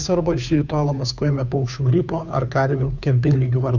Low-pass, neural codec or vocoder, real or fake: 7.2 kHz; codec, 16 kHz, 2 kbps, FunCodec, trained on Chinese and English, 25 frames a second; fake